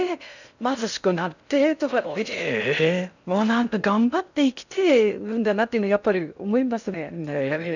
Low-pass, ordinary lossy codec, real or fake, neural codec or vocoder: 7.2 kHz; none; fake; codec, 16 kHz in and 24 kHz out, 0.6 kbps, FocalCodec, streaming, 4096 codes